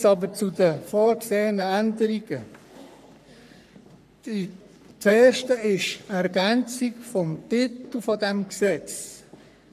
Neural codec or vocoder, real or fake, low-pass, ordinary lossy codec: codec, 44.1 kHz, 3.4 kbps, Pupu-Codec; fake; 14.4 kHz; none